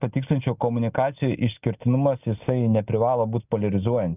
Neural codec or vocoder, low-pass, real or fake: none; 3.6 kHz; real